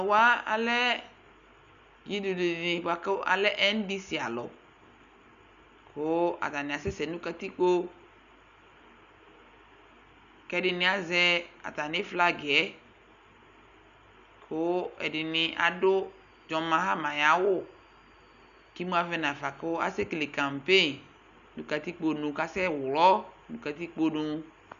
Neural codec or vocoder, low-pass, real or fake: none; 7.2 kHz; real